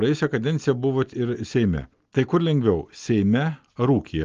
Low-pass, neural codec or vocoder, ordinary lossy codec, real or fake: 7.2 kHz; none; Opus, 32 kbps; real